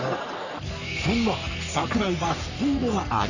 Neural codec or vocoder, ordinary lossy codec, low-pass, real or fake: codec, 44.1 kHz, 3.4 kbps, Pupu-Codec; none; 7.2 kHz; fake